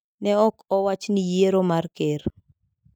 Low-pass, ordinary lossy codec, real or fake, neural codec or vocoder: none; none; real; none